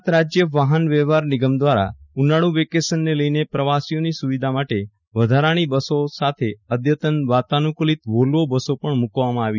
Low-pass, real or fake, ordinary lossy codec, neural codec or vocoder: 7.2 kHz; real; none; none